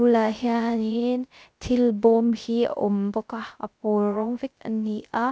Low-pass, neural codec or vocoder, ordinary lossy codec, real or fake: none; codec, 16 kHz, 0.3 kbps, FocalCodec; none; fake